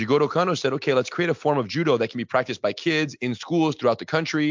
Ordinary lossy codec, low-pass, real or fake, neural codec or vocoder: MP3, 64 kbps; 7.2 kHz; real; none